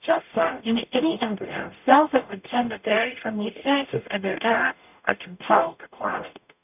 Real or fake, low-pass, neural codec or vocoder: fake; 3.6 kHz; codec, 44.1 kHz, 0.9 kbps, DAC